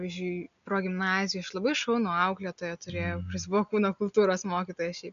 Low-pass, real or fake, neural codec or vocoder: 7.2 kHz; real; none